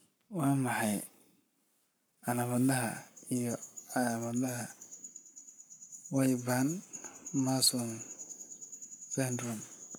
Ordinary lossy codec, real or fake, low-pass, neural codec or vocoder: none; fake; none; codec, 44.1 kHz, 7.8 kbps, Pupu-Codec